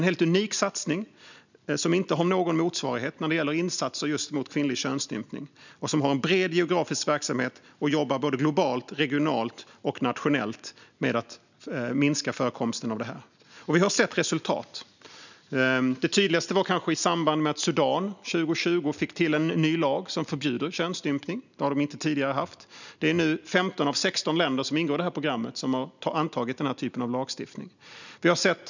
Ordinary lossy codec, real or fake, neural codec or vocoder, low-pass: none; real; none; 7.2 kHz